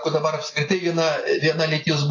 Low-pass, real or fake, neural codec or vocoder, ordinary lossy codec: 7.2 kHz; real; none; AAC, 32 kbps